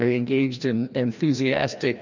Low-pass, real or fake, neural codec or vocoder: 7.2 kHz; fake; codec, 16 kHz, 1 kbps, FreqCodec, larger model